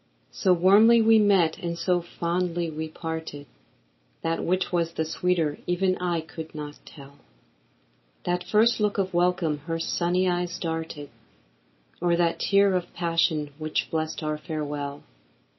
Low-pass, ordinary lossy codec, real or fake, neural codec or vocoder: 7.2 kHz; MP3, 24 kbps; real; none